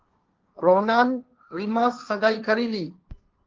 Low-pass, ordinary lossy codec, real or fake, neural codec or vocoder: 7.2 kHz; Opus, 24 kbps; fake; codec, 16 kHz, 1.1 kbps, Voila-Tokenizer